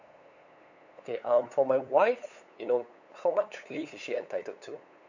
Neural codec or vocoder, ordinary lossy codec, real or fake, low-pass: codec, 16 kHz, 8 kbps, FunCodec, trained on LibriTTS, 25 frames a second; none; fake; 7.2 kHz